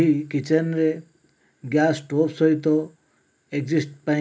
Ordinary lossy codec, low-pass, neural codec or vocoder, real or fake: none; none; none; real